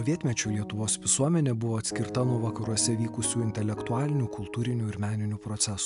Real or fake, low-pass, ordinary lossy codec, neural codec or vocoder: real; 10.8 kHz; AAC, 96 kbps; none